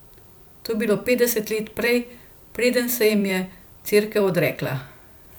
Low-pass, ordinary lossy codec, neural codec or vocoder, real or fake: none; none; vocoder, 44.1 kHz, 128 mel bands every 512 samples, BigVGAN v2; fake